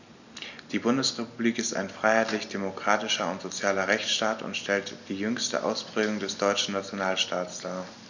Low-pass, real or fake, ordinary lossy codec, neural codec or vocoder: 7.2 kHz; real; none; none